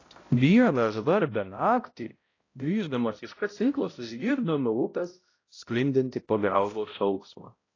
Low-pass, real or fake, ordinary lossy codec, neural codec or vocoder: 7.2 kHz; fake; AAC, 32 kbps; codec, 16 kHz, 0.5 kbps, X-Codec, HuBERT features, trained on balanced general audio